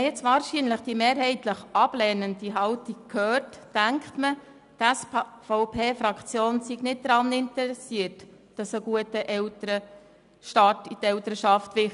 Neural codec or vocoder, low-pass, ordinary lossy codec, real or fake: none; 10.8 kHz; none; real